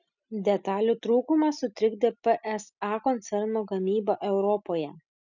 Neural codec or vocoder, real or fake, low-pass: none; real; 7.2 kHz